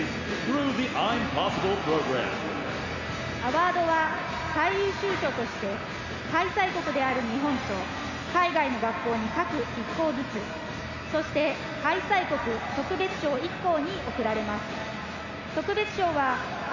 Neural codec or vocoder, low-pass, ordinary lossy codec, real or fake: none; 7.2 kHz; none; real